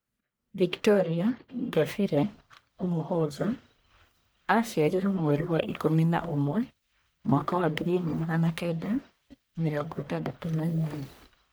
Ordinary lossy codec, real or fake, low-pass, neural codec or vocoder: none; fake; none; codec, 44.1 kHz, 1.7 kbps, Pupu-Codec